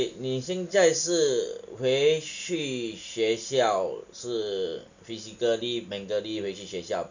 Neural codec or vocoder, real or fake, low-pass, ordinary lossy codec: none; real; 7.2 kHz; none